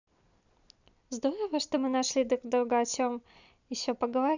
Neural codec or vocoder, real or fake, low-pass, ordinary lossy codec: none; real; 7.2 kHz; none